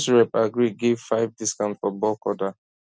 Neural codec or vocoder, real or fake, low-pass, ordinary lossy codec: none; real; none; none